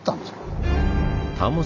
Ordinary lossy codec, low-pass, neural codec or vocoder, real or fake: none; 7.2 kHz; none; real